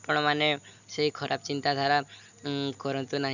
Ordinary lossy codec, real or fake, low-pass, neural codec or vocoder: none; real; 7.2 kHz; none